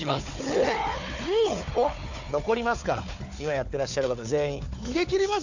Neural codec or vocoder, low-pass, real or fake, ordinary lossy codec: codec, 16 kHz, 4 kbps, FunCodec, trained on Chinese and English, 50 frames a second; 7.2 kHz; fake; none